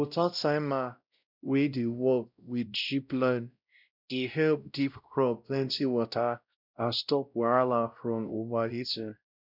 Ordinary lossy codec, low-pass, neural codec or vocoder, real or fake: none; 5.4 kHz; codec, 16 kHz, 0.5 kbps, X-Codec, WavLM features, trained on Multilingual LibriSpeech; fake